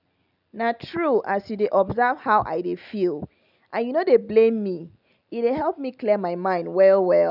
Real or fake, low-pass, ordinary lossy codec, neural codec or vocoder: real; 5.4 kHz; none; none